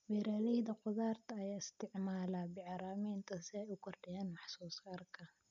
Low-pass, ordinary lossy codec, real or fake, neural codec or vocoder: 7.2 kHz; none; real; none